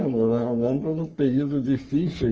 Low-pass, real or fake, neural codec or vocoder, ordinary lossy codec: 7.2 kHz; fake; codec, 44.1 kHz, 3.4 kbps, Pupu-Codec; Opus, 24 kbps